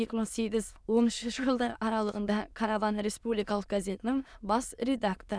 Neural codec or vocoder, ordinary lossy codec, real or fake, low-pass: autoencoder, 22.05 kHz, a latent of 192 numbers a frame, VITS, trained on many speakers; none; fake; none